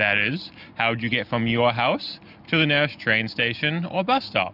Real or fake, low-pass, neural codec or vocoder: real; 5.4 kHz; none